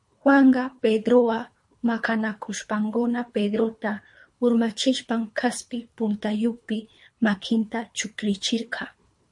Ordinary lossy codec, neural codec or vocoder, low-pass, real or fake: MP3, 48 kbps; codec, 24 kHz, 3 kbps, HILCodec; 10.8 kHz; fake